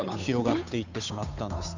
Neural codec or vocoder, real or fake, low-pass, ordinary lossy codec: codec, 16 kHz, 8 kbps, FunCodec, trained on Chinese and English, 25 frames a second; fake; 7.2 kHz; none